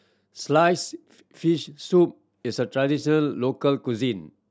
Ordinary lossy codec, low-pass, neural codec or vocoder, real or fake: none; none; none; real